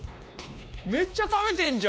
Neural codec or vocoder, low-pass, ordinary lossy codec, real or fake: codec, 16 kHz, 2 kbps, X-Codec, WavLM features, trained on Multilingual LibriSpeech; none; none; fake